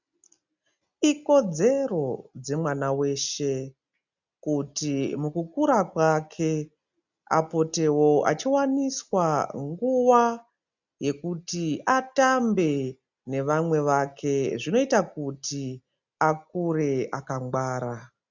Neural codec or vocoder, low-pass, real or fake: none; 7.2 kHz; real